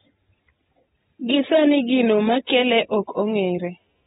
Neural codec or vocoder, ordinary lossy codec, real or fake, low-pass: none; AAC, 16 kbps; real; 19.8 kHz